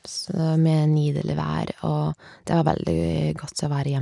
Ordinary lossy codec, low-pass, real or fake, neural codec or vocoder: none; 10.8 kHz; real; none